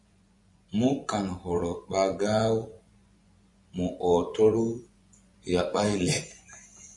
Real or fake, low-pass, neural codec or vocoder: fake; 10.8 kHz; vocoder, 24 kHz, 100 mel bands, Vocos